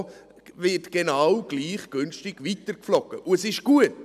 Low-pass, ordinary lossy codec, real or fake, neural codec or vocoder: 14.4 kHz; none; real; none